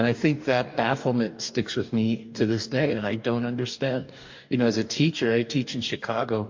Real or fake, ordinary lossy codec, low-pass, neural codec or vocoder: fake; MP3, 48 kbps; 7.2 kHz; codec, 44.1 kHz, 2.6 kbps, DAC